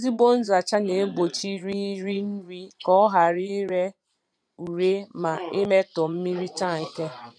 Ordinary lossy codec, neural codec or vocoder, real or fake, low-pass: none; vocoder, 22.05 kHz, 80 mel bands, Vocos; fake; 9.9 kHz